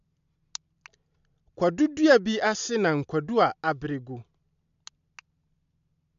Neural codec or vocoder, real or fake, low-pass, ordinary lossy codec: none; real; 7.2 kHz; MP3, 64 kbps